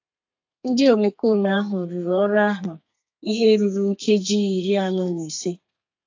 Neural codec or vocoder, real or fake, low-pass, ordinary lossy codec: codec, 32 kHz, 1.9 kbps, SNAC; fake; 7.2 kHz; AAC, 48 kbps